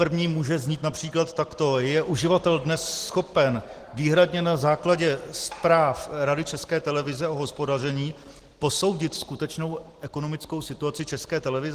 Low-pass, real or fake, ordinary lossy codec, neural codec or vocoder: 14.4 kHz; real; Opus, 16 kbps; none